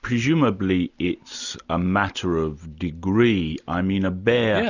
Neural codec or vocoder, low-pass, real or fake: none; 7.2 kHz; real